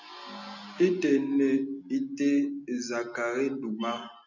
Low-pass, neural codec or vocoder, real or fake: 7.2 kHz; none; real